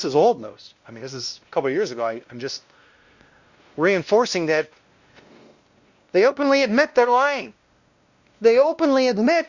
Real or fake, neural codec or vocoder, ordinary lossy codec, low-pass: fake; codec, 16 kHz, 1 kbps, X-Codec, WavLM features, trained on Multilingual LibriSpeech; Opus, 64 kbps; 7.2 kHz